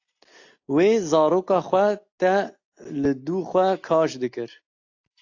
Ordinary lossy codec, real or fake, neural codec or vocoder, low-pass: AAC, 48 kbps; real; none; 7.2 kHz